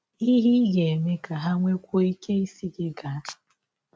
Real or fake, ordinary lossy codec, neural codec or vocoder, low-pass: real; none; none; none